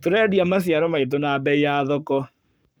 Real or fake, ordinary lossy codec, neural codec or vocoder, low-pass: fake; none; codec, 44.1 kHz, 7.8 kbps, Pupu-Codec; none